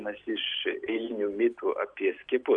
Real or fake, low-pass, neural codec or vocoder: real; 9.9 kHz; none